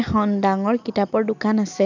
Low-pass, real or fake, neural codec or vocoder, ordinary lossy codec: 7.2 kHz; real; none; none